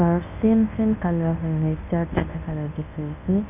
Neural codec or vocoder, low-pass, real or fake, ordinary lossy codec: codec, 24 kHz, 0.9 kbps, WavTokenizer, medium speech release version 1; 3.6 kHz; fake; none